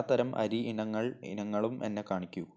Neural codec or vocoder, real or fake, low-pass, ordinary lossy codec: none; real; none; none